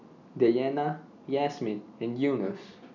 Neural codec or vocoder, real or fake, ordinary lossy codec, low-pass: none; real; none; 7.2 kHz